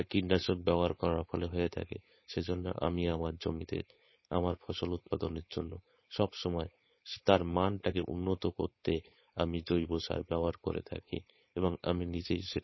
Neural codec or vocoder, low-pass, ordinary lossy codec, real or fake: codec, 16 kHz, 4.8 kbps, FACodec; 7.2 kHz; MP3, 24 kbps; fake